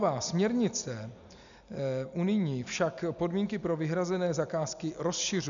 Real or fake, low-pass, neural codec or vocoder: real; 7.2 kHz; none